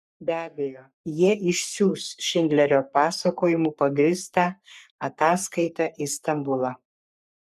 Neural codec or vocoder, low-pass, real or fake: codec, 44.1 kHz, 3.4 kbps, Pupu-Codec; 14.4 kHz; fake